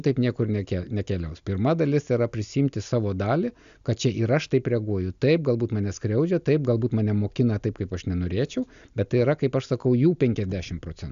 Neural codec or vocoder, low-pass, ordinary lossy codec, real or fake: none; 7.2 kHz; AAC, 96 kbps; real